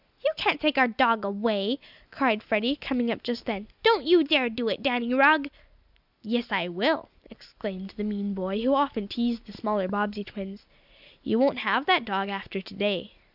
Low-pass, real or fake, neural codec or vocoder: 5.4 kHz; real; none